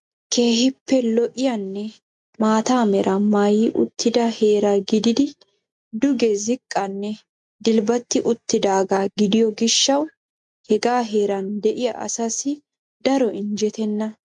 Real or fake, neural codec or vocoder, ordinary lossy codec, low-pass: real; none; MP3, 64 kbps; 10.8 kHz